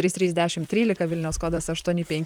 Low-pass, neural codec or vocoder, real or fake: 19.8 kHz; vocoder, 44.1 kHz, 128 mel bands, Pupu-Vocoder; fake